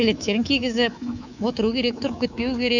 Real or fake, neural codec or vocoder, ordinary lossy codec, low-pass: fake; codec, 24 kHz, 3.1 kbps, DualCodec; none; 7.2 kHz